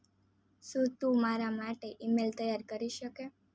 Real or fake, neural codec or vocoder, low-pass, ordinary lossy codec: real; none; none; none